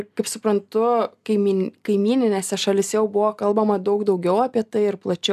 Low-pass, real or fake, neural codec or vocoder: 14.4 kHz; real; none